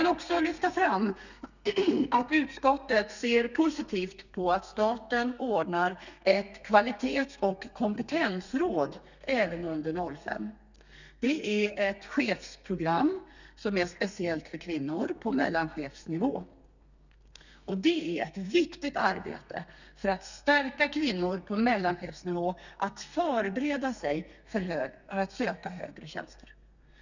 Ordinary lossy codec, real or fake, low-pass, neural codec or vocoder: none; fake; 7.2 kHz; codec, 32 kHz, 1.9 kbps, SNAC